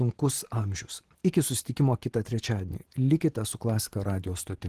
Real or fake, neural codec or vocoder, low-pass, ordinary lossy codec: fake; vocoder, 44.1 kHz, 128 mel bands every 512 samples, BigVGAN v2; 14.4 kHz; Opus, 16 kbps